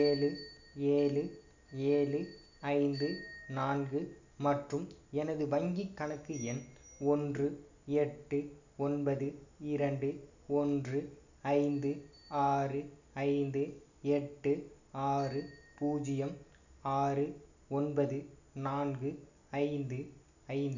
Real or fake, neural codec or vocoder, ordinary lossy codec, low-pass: real; none; none; 7.2 kHz